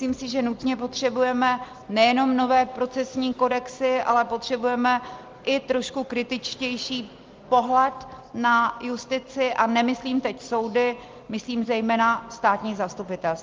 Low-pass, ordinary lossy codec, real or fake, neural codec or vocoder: 7.2 kHz; Opus, 32 kbps; real; none